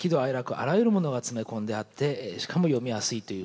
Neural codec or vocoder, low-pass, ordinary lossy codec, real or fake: none; none; none; real